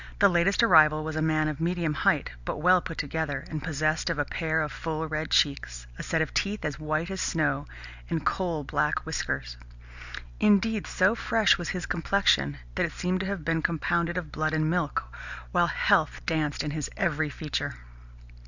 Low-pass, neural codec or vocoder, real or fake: 7.2 kHz; none; real